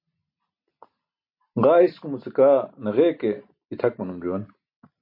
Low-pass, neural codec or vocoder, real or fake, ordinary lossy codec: 5.4 kHz; none; real; MP3, 32 kbps